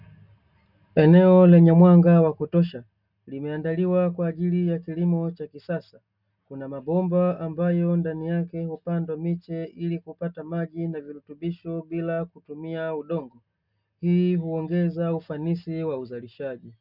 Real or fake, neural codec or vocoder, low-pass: real; none; 5.4 kHz